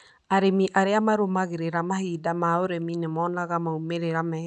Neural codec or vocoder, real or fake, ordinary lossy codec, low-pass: none; real; none; 10.8 kHz